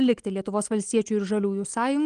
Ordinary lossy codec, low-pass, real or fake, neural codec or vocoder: Opus, 24 kbps; 9.9 kHz; real; none